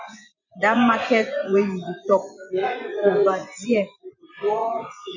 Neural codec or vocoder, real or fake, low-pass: vocoder, 24 kHz, 100 mel bands, Vocos; fake; 7.2 kHz